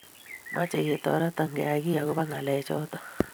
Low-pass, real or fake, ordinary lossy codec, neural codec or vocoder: none; fake; none; vocoder, 44.1 kHz, 128 mel bands every 256 samples, BigVGAN v2